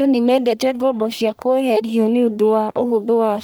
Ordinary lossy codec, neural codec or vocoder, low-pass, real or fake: none; codec, 44.1 kHz, 1.7 kbps, Pupu-Codec; none; fake